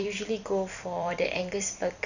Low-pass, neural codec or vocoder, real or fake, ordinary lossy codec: 7.2 kHz; vocoder, 44.1 kHz, 128 mel bands every 256 samples, BigVGAN v2; fake; none